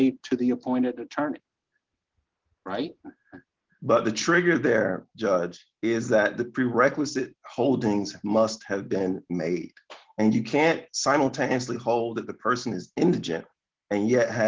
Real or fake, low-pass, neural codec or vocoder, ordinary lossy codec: real; 7.2 kHz; none; Opus, 16 kbps